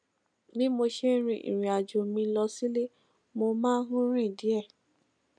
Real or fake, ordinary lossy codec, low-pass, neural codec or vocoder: real; none; 9.9 kHz; none